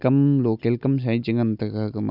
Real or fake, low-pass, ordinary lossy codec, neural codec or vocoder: real; 5.4 kHz; none; none